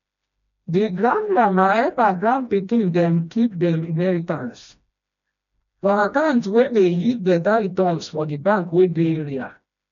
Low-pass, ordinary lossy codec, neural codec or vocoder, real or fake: 7.2 kHz; none; codec, 16 kHz, 1 kbps, FreqCodec, smaller model; fake